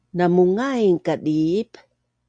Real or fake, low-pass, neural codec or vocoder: real; 9.9 kHz; none